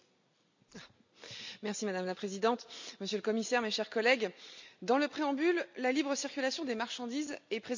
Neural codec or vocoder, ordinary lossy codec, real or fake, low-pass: none; MP3, 64 kbps; real; 7.2 kHz